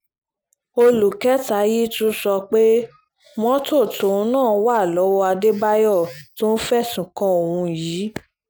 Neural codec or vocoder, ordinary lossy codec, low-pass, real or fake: none; none; none; real